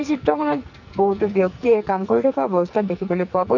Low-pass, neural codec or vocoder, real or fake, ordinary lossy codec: 7.2 kHz; codec, 44.1 kHz, 2.6 kbps, SNAC; fake; none